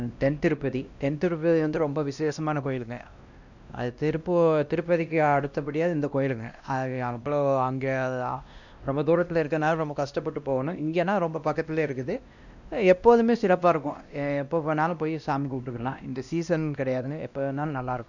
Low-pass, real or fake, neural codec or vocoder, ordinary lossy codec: 7.2 kHz; fake; codec, 16 kHz, 1 kbps, X-Codec, WavLM features, trained on Multilingual LibriSpeech; none